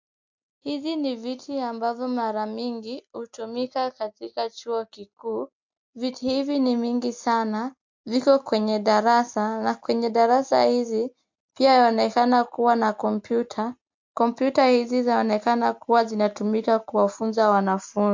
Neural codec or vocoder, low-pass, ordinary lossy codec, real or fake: none; 7.2 kHz; MP3, 48 kbps; real